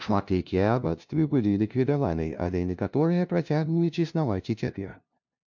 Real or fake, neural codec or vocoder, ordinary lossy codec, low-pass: fake; codec, 16 kHz, 0.5 kbps, FunCodec, trained on LibriTTS, 25 frames a second; MP3, 64 kbps; 7.2 kHz